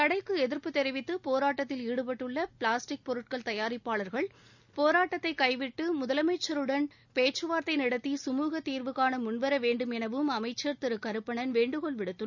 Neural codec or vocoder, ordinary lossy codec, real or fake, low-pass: none; none; real; 7.2 kHz